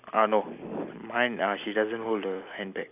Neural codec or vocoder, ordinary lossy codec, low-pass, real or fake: none; none; 3.6 kHz; real